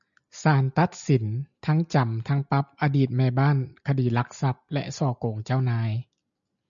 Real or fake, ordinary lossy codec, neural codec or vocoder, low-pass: real; MP3, 96 kbps; none; 7.2 kHz